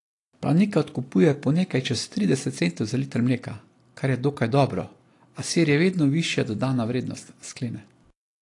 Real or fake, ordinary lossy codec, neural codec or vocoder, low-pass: real; AAC, 48 kbps; none; 10.8 kHz